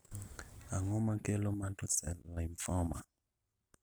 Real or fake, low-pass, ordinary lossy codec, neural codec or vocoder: real; none; none; none